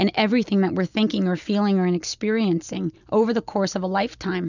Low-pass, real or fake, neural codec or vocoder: 7.2 kHz; real; none